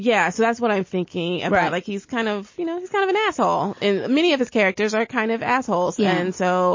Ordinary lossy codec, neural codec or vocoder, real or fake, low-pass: MP3, 32 kbps; none; real; 7.2 kHz